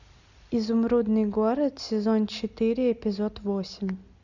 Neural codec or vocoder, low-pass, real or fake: none; 7.2 kHz; real